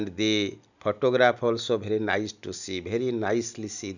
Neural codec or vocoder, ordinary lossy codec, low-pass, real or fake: none; none; 7.2 kHz; real